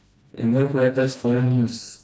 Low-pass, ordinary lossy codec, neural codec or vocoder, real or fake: none; none; codec, 16 kHz, 1 kbps, FreqCodec, smaller model; fake